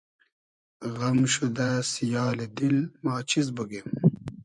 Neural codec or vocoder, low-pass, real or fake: vocoder, 24 kHz, 100 mel bands, Vocos; 10.8 kHz; fake